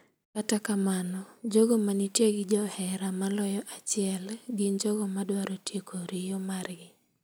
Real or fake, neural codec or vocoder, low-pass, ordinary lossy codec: real; none; none; none